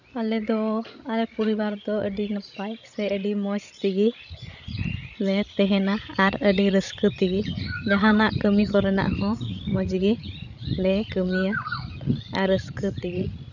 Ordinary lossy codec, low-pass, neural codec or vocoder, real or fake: none; 7.2 kHz; codec, 16 kHz, 16 kbps, FreqCodec, larger model; fake